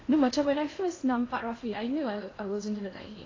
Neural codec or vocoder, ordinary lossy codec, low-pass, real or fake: codec, 16 kHz in and 24 kHz out, 0.8 kbps, FocalCodec, streaming, 65536 codes; AAC, 32 kbps; 7.2 kHz; fake